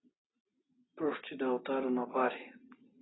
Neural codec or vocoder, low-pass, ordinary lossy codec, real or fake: none; 7.2 kHz; AAC, 16 kbps; real